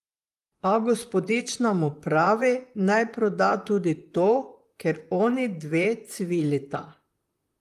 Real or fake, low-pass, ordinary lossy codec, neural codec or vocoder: fake; 14.4 kHz; Opus, 32 kbps; vocoder, 44.1 kHz, 128 mel bands, Pupu-Vocoder